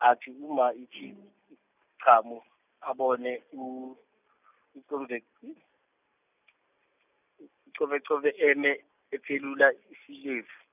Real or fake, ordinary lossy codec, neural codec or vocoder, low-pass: real; none; none; 3.6 kHz